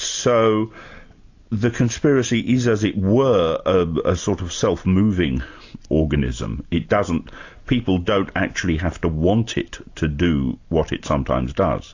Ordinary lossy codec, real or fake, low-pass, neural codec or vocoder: AAC, 48 kbps; real; 7.2 kHz; none